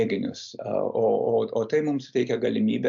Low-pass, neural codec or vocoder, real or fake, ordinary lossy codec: 7.2 kHz; none; real; MP3, 64 kbps